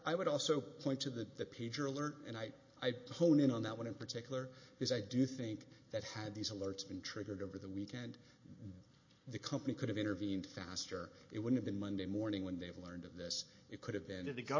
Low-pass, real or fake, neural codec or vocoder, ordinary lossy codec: 7.2 kHz; real; none; MP3, 32 kbps